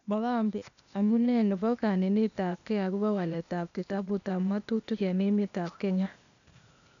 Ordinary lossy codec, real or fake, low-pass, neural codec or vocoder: none; fake; 7.2 kHz; codec, 16 kHz, 0.8 kbps, ZipCodec